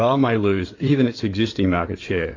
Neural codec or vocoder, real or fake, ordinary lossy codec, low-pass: codec, 16 kHz in and 24 kHz out, 2.2 kbps, FireRedTTS-2 codec; fake; AAC, 32 kbps; 7.2 kHz